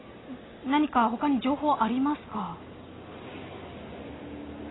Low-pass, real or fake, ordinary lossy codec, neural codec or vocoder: 7.2 kHz; real; AAC, 16 kbps; none